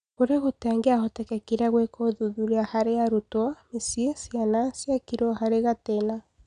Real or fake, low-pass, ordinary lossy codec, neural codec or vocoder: real; 9.9 kHz; none; none